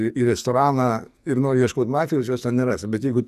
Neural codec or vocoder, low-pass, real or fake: codec, 44.1 kHz, 2.6 kbps, SNAC; 14.4 kHz; fake